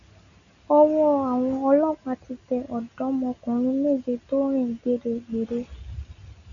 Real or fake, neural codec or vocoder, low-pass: real; none; 7.2 kHz